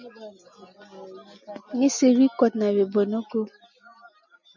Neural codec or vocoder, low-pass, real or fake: none; 7.2 kHz; real